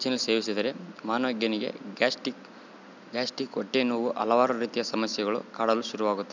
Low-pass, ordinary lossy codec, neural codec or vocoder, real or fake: 7.2 kHz; none; none; real